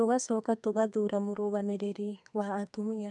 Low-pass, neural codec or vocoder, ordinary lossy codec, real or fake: 10.8 kHz; codec, 32 kHz, 1.9 kbps, SNAC; none; fake